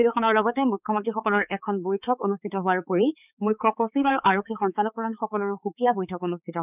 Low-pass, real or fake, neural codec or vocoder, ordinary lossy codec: 3.6 kHz; fake; codec, 16 kHz, 4 kbps, X-Codec, HuBERT features, trained on balanced general audio; none